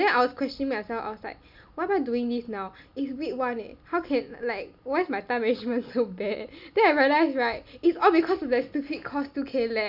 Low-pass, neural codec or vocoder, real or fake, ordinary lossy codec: 5.4 kHz; none; real; none